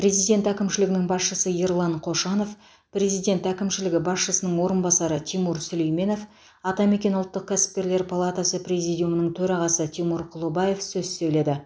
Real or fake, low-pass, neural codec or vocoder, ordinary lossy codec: real; none; none; none